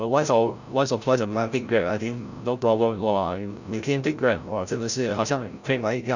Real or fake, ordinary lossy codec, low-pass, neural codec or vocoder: fake; none; 7.2 kHz; codec, 16 kHz, 0.5 kbps, FreqCodec, larger model